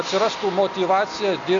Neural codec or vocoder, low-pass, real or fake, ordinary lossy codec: none; 7.2 kHz; real; AAC, 64 kbps